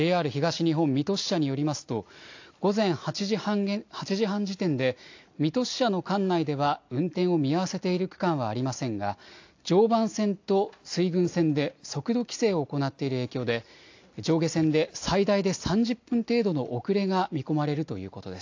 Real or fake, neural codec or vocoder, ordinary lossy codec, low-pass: real; none; AAC, 48 kbps; 7.2 kHz